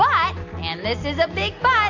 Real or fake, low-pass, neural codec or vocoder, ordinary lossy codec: real; 7.2 kHz; none; AAC, 48 kbps